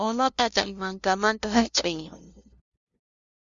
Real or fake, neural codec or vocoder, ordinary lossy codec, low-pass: fake; codec, 16 kHz, 0.5 kbps, FunCodec, trained on LibriTTS, 25 frames a second; Opus, 64 kbps; 7.2 kHz